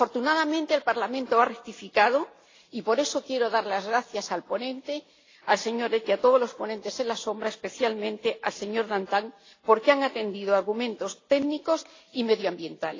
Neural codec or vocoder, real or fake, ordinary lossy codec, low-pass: none; real; AAC, 32 kbps; 7.2 kHz